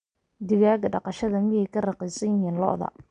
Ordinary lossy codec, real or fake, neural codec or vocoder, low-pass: none; real; none; 9.9 kHz